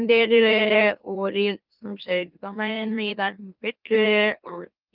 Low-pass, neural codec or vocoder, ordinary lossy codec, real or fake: 5.4 kHz; autoencoder, 44.1 kHz, a latent of 192 numbers a frame, MeloTTS; Opus, 24 kbps; fake